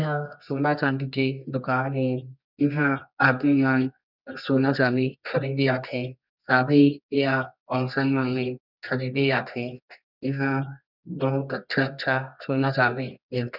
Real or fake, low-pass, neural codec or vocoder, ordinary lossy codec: fake; 5.4 kHz; codec, 24 kHz, 0.9 kbps, WavTokenizer, medium music audio release; none